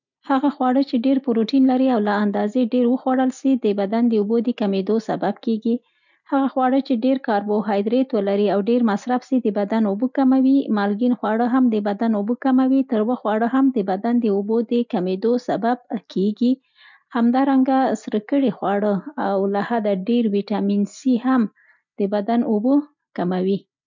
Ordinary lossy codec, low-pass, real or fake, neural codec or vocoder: none; 7.2 kHz; real; none